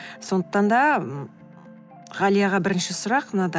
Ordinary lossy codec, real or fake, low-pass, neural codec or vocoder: none; real; none; none